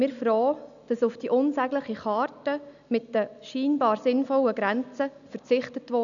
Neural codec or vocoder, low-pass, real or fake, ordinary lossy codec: none; 7.2 kHz; real; none